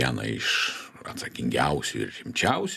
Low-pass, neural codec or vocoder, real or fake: 14.4 kHz; none; real